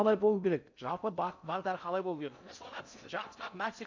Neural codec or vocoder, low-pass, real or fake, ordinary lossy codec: codec, 16 kHz in and 24 kHz out, 0.6 kbps, FocalCodec, streaming, 4096 codes; 7.2 kHz; fake; MP3, 64 kbps